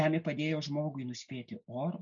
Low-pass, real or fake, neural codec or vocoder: 7.2 kHz; real; none